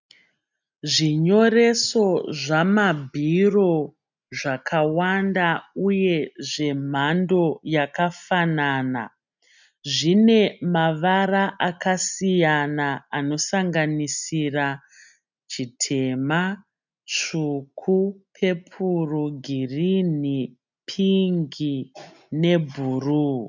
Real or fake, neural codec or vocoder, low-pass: real; none; 7.2 kHz